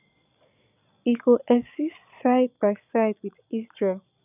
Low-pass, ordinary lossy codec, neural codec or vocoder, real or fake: 3.6 kHz; none; vocoder, 22.05 kHz, 80 mel bands, Vocos; fake